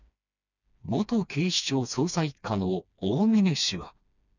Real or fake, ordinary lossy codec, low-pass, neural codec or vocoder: fake; none; 7.2 kHz; codec, 16 kHz, 2 kbps, FreqCodec, smaller model